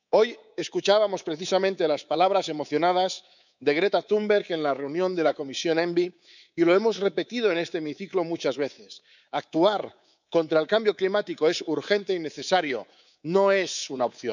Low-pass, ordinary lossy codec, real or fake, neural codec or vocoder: 7.2 kHz; none; fake; codec, 24 kHz, 3.1 kbps, DualCodec